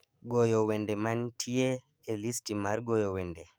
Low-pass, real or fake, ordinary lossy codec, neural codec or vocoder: none; fake; none; codec, 44.1 kHz, 7.8 kbps, DAC